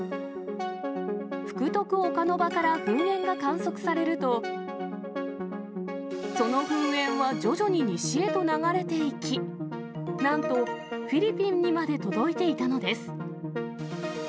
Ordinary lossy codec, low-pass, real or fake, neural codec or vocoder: none; none; real; none